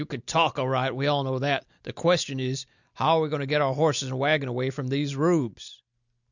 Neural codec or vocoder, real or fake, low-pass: none; real; 7.2 kHz